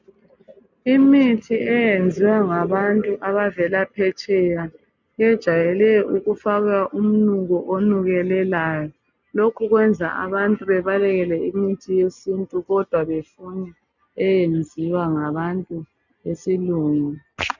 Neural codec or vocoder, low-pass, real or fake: none; 7.2 kHz; real